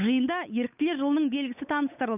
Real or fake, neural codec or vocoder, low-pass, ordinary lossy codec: fake; codec, 16 kHz, 8 kbps, FunCodec, trained on Chinese and English, 25 frames a second; 3.6 kHz; none